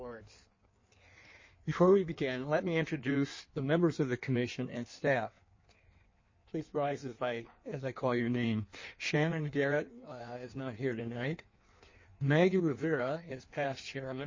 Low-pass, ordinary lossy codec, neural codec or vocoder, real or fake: 7.2 kHz; MP3, 32 kbps; codec, 16 kHz in and 24 kHz out, 1.1 kbps, FireRedTTS-2 codec; fake